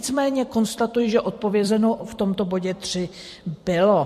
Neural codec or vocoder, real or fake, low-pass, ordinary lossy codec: vocoder, 44.1 kHz, 128 mel bands every 256 samples, BigVGAN v2; fake; 14.4 kHz; MP3, 64 kbps